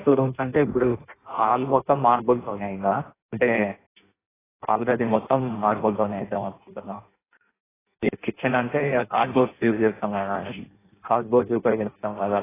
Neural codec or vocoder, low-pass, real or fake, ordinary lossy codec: codec, 16 kHz in and 24 kHz out, 0.6 kbps, FireRedTTS-2 codec; 3.6 kHz; fake; AAC, 16 kbps